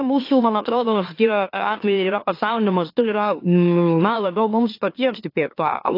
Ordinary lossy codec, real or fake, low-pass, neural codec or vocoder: AAC, 32 kbps; fake; 5.4 kHz; autoencoder, 44.1 kHz, a latent of 192 numbers a frame, MeloTTS